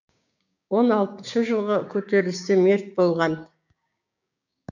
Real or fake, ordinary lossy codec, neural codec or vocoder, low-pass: fake; none; codec, 16 kHz, 6 kbps, DAC; 7.2 kHz